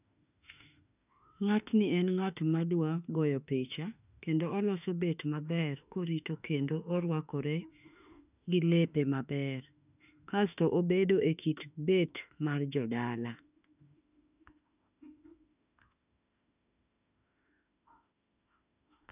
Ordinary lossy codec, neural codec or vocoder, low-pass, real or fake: none; autoencoder, 48 kHz, 32 numbers a frame, DAC-VAE, trained on Japanese speech; 3.6 kHz; fake